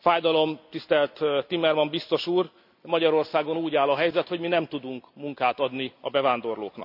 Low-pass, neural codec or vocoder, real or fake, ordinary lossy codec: 5.4 kHz; none; real; none